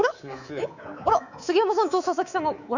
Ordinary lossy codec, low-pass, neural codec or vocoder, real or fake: none; 7.2 kHz; codec, 24 kHz, 3.1 kbps, DualCodec; fake